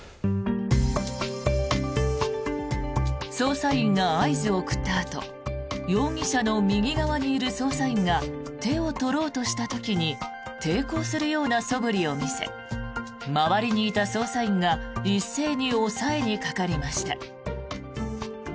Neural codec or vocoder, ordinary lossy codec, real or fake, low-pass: none; none; real; none